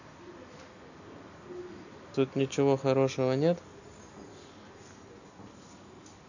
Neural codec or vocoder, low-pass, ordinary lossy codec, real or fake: none; 7.2 kHz; none; real